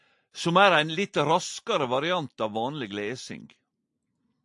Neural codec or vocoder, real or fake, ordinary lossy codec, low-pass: none; real; AAC, 64 kbps; 10.8 kHz